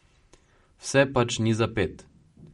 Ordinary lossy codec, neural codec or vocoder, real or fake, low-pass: MP3, 48 kbps; none; real; 19.8 kHz